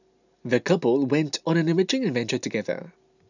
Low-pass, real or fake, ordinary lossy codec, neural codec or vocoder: 7.2 kHz; real; none; none